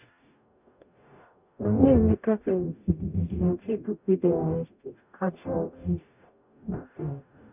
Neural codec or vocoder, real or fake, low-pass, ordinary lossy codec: codec, 44.1 kHz, 0.9 kbps, DAC; fake; 3.6 kHz; none